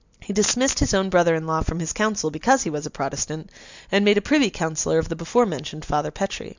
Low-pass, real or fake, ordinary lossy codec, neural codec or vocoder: 7.2 kHz; real; Opus, 64 kbps; none